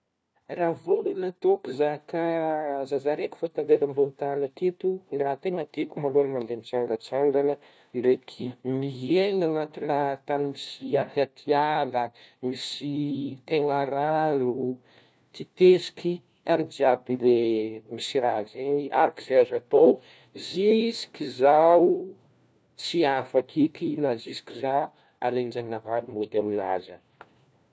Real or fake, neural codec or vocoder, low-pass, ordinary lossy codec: fake; codec, 16 kHz, 1 kbps, FunCodec, trained on LibriTTS, 50 frames a second; none; none